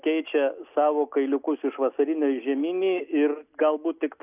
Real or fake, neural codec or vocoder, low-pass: real; none; 3.6 kHz